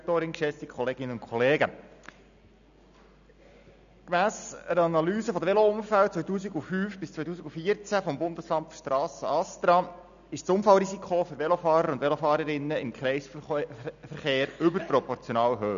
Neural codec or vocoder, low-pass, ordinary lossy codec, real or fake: none; 7.2 kHz; none; real